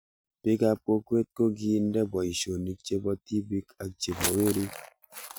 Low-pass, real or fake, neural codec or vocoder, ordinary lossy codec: none; real; none; none